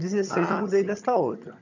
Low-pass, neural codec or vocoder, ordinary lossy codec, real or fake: 7.2 kHz; vocoder, 22.05 kHz, 80 mel bands, HiFi-GAN; none; fake